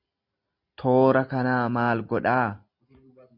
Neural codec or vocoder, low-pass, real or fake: none; 5.4 kHz; real